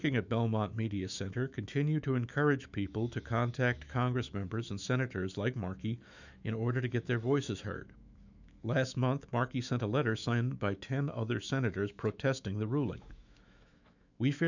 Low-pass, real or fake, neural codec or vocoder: 7.2 kHz; fake; autoencoder, 48 kHz, 128 numbers a frame, DAC-VAE, trained on Japanese speech